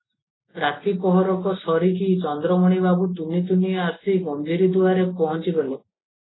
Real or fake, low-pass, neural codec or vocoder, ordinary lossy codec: real; 7.2 kHz; none; AAC, 16 kbps